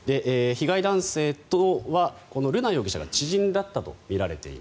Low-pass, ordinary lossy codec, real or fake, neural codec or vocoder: none; none; real; none